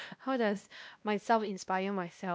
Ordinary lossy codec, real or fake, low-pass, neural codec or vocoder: none; fake; none; codec, 16 kHz, 1 kbps, X-Codec, WavLM features, trained on Multilingual LibriSpeech